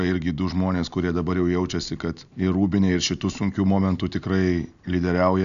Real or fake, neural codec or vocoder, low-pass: real; none; 7.2 kHz